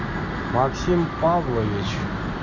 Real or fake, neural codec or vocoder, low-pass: real; none; 7.2 kHz